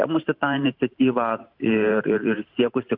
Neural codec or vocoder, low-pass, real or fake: vocoder, 44.1 kHz, 128 mel bands every 512 samples, BigVGAN v2; 5.4 kHz; fake